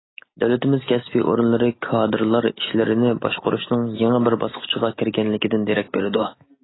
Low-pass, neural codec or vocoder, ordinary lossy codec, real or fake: 7.2 kHz; none; AAC, 16 kbps; real